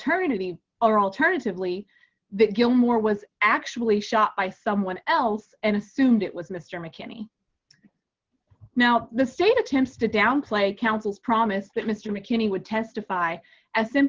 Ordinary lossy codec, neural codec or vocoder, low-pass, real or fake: Opus, 16 kbps; none; 7.2 kHz; real